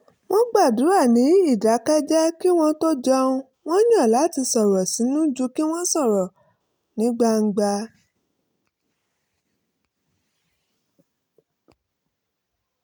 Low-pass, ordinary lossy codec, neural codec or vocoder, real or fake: none; none; none; real